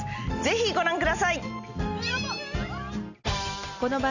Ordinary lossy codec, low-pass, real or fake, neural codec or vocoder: none; 7.2 kHz; real; none